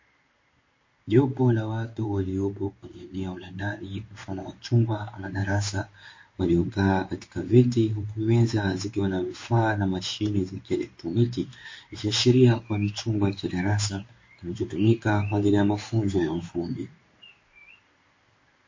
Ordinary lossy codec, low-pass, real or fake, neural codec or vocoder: MP3, 32 kbps; 7.2 kHz; fake; codec, 16 kHz in and 24 kHz out, 1 kbps, XY-Tokenizer